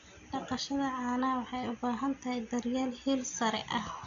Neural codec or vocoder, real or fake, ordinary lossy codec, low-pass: none; real; AAC, 96 kbps; 7.2 kHz